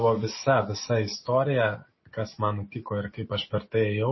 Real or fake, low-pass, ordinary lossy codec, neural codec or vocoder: real; 7.2 kHz; MP3, 24 kbps; none